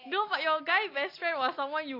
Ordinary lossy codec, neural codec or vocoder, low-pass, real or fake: AAC, 32 kbps; none; 5.4 kHz; real